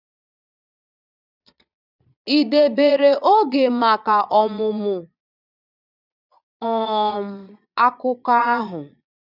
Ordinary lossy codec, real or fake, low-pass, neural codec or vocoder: none; fake; 5.4 kHz; vocoder, 22.05 kHz, 80 mel bands, Vocos